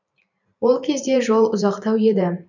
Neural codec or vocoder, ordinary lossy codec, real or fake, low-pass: vocoder, 44.1 kHz, 128 mel bands every 512 samples, BigVGAN v2; none; fake; 7.2 kHz